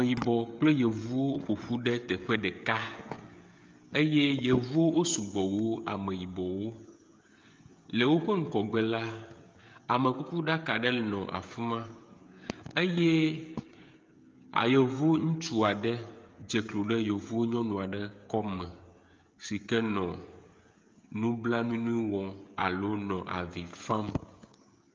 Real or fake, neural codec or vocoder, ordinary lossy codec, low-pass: fake; codec, 16 kHz, 16 kbps, FreqCodec, smaller model; Opus, 24 kbps; 7.2 kHz